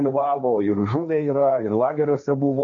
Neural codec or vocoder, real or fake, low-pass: codec, 16 kHz, 1.1 kbps, Voila-Tokenizer; fake; 7.2 kHz